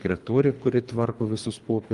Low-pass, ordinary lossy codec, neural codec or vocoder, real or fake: 10.8 kHz; Opus, 32 kbps; codec, 24 kHz, 3 kbps, HILCodec; fake